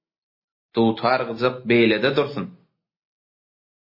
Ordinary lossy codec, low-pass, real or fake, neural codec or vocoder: MP3, 24 kbps; 5.4 kHz; real; none